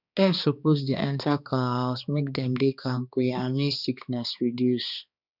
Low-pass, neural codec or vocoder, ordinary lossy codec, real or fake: 5.4 kHz; codec, 16 kHz, 2 kbps, X-Codec, HuBERT features, trained on balanced general audio; none; fake